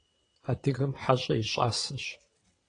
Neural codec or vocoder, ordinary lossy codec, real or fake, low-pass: vocoder, 22.05 kHz, 80 mel bands, WaveNeXt; AAC, 32 kbps; fake; 9.9 kHz